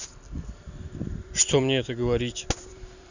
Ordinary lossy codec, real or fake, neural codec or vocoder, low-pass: none; fake; vocoder, 44.1 kHz, 128 mel bands every 256 samples, BigVGAN v2; 7.2 kHz